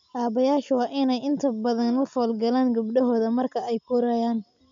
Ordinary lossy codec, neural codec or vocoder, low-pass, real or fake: MP3, 64 kbps; none; 7.2 kHz; real